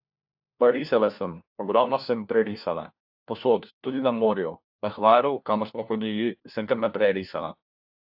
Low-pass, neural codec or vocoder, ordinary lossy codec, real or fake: 5.4 kHz; codec, 16 kHz, 1 kbps, FunCodec, trained on LibriTTS, 50 frames a second; AAC, 48 kbps; fake